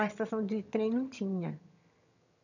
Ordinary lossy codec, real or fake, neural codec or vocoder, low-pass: none; fake; vocoder, 22.05 kHz, 80 mel bands, HiFi-GAN; 7.2 kHz